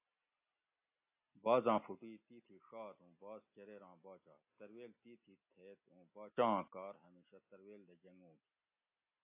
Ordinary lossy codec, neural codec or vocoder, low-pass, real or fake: AAC, 32 kbps; none; 3.6 kHz; real